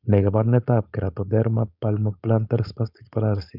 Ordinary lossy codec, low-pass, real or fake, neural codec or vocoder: none; 5.4 kHz; fake; codec, 16 kHz, 4.8 kbps, FACodec